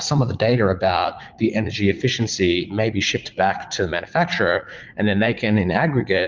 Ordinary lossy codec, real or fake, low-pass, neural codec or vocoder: Opus, 24 kbps; fake; 7.2 kHz; vocoder, 44.1 kHz, 80 mel bands, Vocos